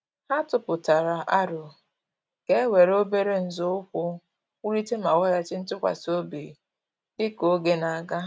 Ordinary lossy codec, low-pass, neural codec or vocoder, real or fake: none; none; none; real